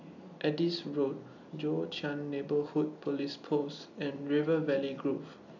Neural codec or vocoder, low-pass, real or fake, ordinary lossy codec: none; 7.2 kHz; real; none